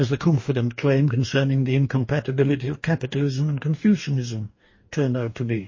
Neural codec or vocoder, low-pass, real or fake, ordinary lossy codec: codec, 44.1 kHz, 2.6 kbps, DAC; 7.2 kHz; fake; MP3, 32 kbps